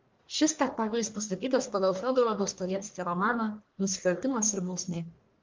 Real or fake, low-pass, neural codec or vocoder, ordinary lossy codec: fake; 7.2 kHz; codec, 44.1 kHz, 1.7 kbps, Pupu-Codec; Opus, 32 kbps